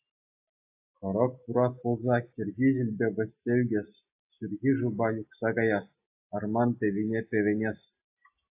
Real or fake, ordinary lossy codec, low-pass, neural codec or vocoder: real; AAC, 32 kbps; 3.6 kHz; none